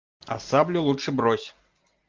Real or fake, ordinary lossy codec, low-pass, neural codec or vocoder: real; Opus, 24 kbps; 7.2 kHz; none